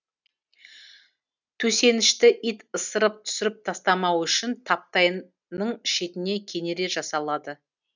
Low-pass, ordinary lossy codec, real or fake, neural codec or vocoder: 7.2 kHz; none; real; none